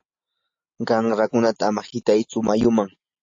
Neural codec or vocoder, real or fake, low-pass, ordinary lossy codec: none; real; 7.2 kHz; AAC, 48 kbps